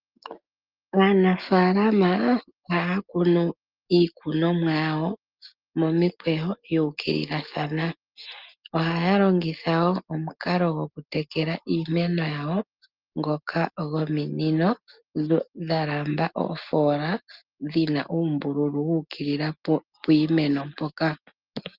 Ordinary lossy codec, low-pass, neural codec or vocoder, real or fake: Opus, 32 kbps; 5.4 kHz; none; real